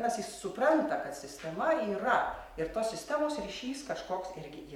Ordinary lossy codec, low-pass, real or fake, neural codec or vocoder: MP3, 96 kbps; 19.8 kHz; fake; vocoder, 44.1 kHz, 128 mel bands every 512 samples, BigVGAN v2